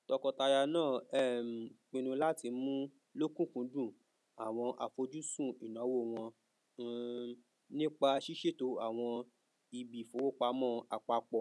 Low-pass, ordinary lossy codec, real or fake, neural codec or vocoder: none; none; real; none